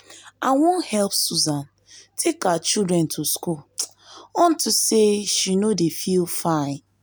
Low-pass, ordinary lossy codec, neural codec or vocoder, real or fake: none; none; none; real